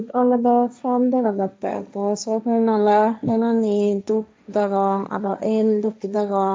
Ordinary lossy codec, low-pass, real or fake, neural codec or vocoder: none; none; fake; codec, 16 kHz, 1.1 kbps, Voila-Tokenizer